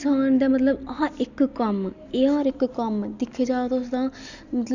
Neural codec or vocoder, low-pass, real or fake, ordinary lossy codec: none; 7.2 kHz; real; none